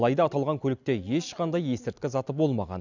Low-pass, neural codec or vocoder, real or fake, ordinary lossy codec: 7.2 kHz; none; real; none